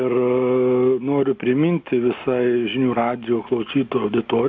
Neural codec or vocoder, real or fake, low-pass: none; real; 7.2 kHz